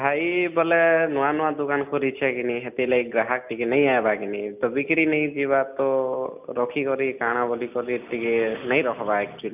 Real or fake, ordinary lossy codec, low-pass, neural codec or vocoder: real; none; 3.6 kHz; none